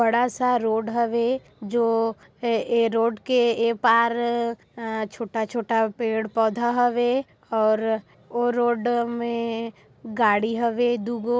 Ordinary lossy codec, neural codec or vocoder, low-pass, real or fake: none; none; none; real